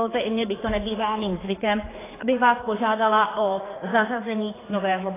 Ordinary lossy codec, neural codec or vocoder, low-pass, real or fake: AAC, 16 kbps; codec, 16 kHz, 4 kbps, X-Codec, HuBERT features, trained on general audio; 3.6 kHz; fake